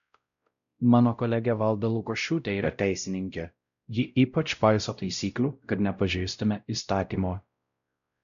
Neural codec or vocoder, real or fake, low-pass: codec, 16 kHz, 0.5 kbps, X-Codec, WavLM features, trained on Multilingual LibriSpeech; fake; 7.2 kHz